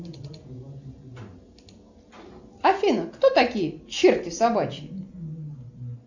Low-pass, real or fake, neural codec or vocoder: 7.2 kHz; real; none